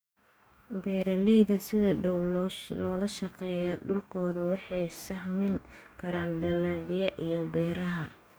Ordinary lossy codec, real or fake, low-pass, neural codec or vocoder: none; fake; none; codec, 44.1 kHz, 2.6 kbps, DAC